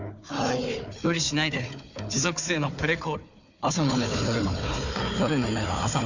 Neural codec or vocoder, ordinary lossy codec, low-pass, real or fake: codec, 16 kHz, 4 kbps, FunCodec, trained on Chinese and English, 50 frames a second; none; 7.2 kHz; fake